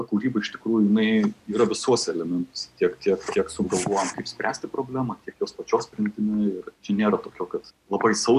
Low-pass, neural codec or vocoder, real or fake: 14.4 kHz; none; real